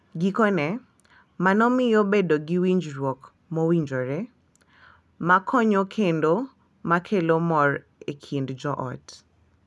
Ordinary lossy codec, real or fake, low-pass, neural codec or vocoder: none; real; none; none